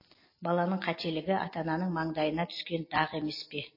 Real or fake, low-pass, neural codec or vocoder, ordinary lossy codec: real; 5.4 kHz; none; MP3, 24 kbps